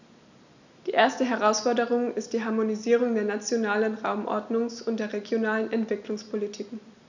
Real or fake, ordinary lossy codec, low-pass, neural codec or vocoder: real; none; 7.2 kHz; none